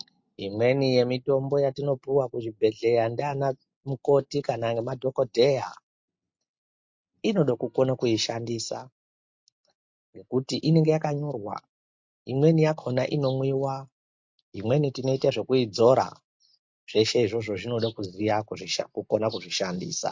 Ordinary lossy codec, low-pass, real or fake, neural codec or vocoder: MP3, 48 kbps; 7.2 kHz; real; none